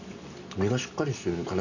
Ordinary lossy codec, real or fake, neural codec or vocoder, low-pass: none; real; none; 7.2 kHz